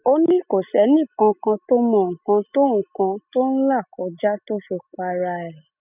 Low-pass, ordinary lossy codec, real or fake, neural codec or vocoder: 3.6 kHz; none; real; none